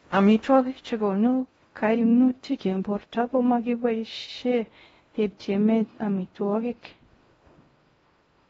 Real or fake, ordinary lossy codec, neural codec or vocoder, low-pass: fake; AAC, 24 kbps; codec, 16 kHz in and 24 kHz out, 0.6 kbps, FocalCodec, streaming, 4096 codes; 10.8 kHz